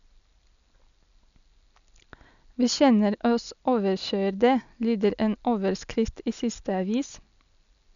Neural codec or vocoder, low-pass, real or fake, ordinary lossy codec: none; 7.2 kHz; real; MP3, 96 kbps